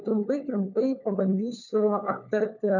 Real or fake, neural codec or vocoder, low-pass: fake; codec, 16 kHz, 4 kbps, FunCodec, trained on LibriTTS, 50 frames a second; 7.2 kHz